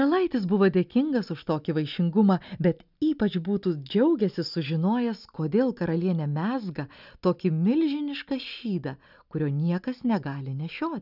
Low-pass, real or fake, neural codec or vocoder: 5.4 kHz; real; none